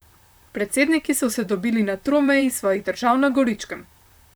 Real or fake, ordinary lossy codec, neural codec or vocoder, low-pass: fake; none; vocoder, 44.1 kHz, 128 mel bands, Pupu-Vocoder; none